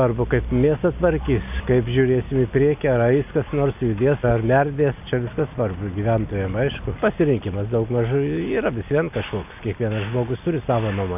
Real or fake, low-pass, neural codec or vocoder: real; 3.6 kHz; none